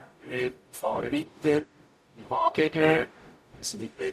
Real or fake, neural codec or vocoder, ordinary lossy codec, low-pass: fake; codec, 44.1 kHz, 0.9 kbps, DAC; none; 14.4 kHz